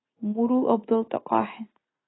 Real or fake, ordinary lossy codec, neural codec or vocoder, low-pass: real; AAC, 16 kbps; none; 7.2 kHz